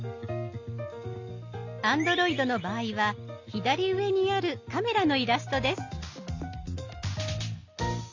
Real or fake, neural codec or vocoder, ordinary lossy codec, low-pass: real; none; AAC, 48 kbps; 7.2 kHz